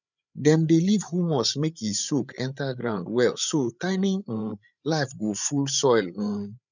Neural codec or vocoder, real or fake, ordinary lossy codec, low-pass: codec, 16 kHz, 8 kbps, FreqCodec, larger model; fake; none; 7.2 kHz